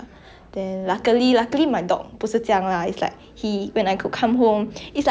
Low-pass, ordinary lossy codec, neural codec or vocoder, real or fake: none; none; none; real